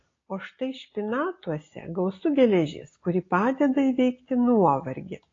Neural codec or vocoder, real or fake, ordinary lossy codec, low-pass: none; real; AAC, 32 kbps; 7.2 kHz